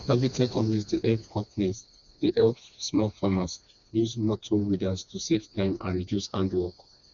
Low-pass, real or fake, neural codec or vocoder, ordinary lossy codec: 7.2 kHz; fake; codec, 16 kHz, 2 kbps, FreqCodec, smaller model; none